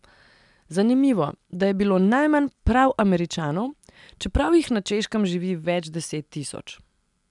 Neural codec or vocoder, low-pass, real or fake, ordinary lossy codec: none; 10.8 kHz; real; none